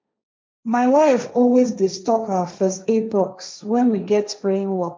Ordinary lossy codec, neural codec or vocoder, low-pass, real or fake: none; codec, 16 kHz, 1.1 kbps, Voila-Tokenizer; none; fake